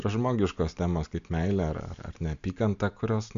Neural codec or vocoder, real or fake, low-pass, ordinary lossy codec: none; real; 7.2 kHz; MP3, 64 kbps